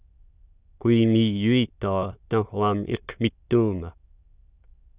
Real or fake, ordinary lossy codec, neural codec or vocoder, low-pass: fake; Opus, 64 kbps; autoencoder, 22.05 kHz, a latent of 192 numbers a frame, VITS, trained on many speakers; 3.6 kHz